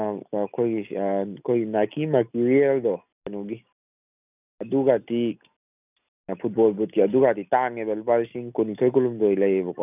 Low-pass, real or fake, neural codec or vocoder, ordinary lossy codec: 3.6 kHz; real; none; none